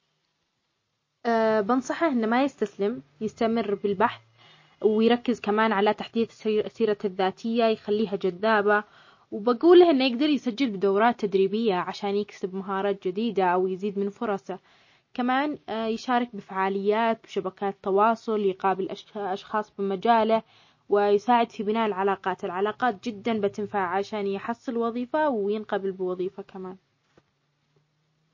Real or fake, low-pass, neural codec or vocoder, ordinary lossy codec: real; 7.2 kHz; none; MP3, 32 kbps